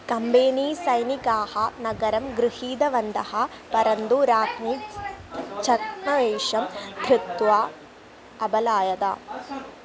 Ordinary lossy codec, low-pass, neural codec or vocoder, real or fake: none; none; none; real